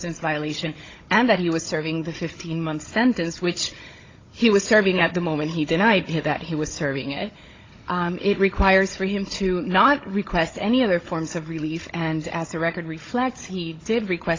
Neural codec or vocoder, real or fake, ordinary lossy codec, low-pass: codec, 16 kHz, 16 kbps, FunCodec, trained on Chinese and English, 50 frames a second; fake; AAC, 32 kbps; 7.2 kHz